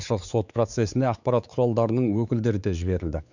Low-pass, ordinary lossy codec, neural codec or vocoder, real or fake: 7.2 kHz; none; codec, 16 kHz, 4 kbps, X-Codec, WavLM features, trained on Multilingual LibriSpeech; fake